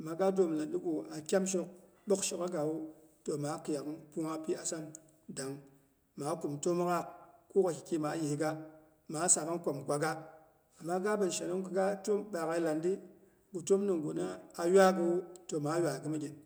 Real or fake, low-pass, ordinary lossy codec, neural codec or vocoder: real; none; none; none